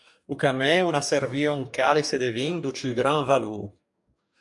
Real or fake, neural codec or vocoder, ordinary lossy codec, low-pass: fake; codec, 44.1 kHz, 2.6 kbps, DAC; MP3, 96 kbps; 10.8 kHz